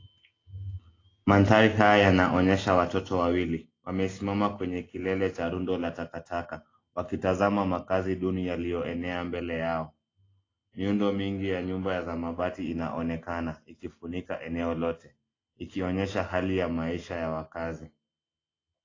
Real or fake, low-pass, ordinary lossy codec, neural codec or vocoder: real; 7.2 kHz; AAC, 32 kbps; none